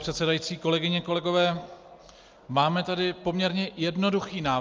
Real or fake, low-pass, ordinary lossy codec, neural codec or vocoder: real; 7.2 kHz; Opus, 24 kbps; none